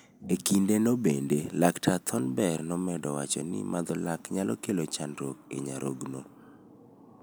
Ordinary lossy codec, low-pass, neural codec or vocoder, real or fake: none; none; none; real